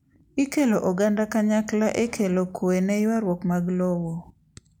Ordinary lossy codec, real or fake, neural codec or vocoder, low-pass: none; real; none; 19.8 kHz